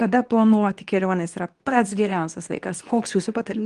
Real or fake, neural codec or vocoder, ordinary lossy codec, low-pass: fake; codec, 24 kHz, 0.9 kbps, WavTokenizer, medium speech release version 2; Opus, 24 kbps; 10.8 kHz